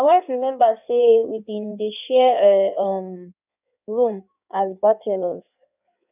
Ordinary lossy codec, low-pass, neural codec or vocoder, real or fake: none; 3.6 kHz; codec, 16 kHz in and 24 kHz out, 1.1 kbps, FireRedTTS-2 codec; fake